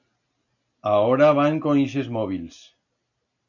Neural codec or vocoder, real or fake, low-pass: none; real; 7.2 kHz